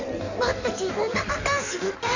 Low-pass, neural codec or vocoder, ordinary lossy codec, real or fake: 7.2 kHz; codec, 16 kHz in and 24 kHz out, 1.1 kbps, FireRedTTS-2 codec; none; fake